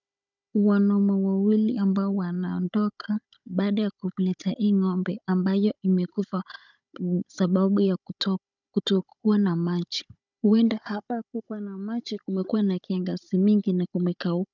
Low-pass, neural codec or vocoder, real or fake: 7.2 kHz; codec, 16 kHz, 16 kbps, FunCodec, trained on Chinese and English, 50 frames a second; fake